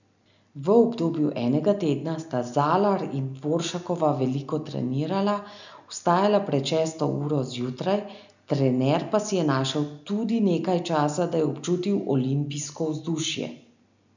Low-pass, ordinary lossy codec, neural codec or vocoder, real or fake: 7.2 kHz; none; none; real